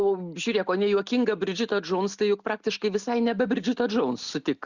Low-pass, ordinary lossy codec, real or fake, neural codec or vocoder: 7.2 kHz; Opus, 64 kbps; real; none